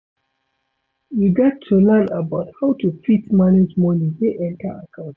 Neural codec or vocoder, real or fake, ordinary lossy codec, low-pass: none; real; none; none